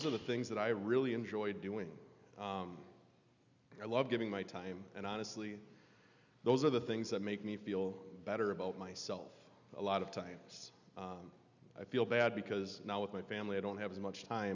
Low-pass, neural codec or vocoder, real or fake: 7.2 kHz; none; real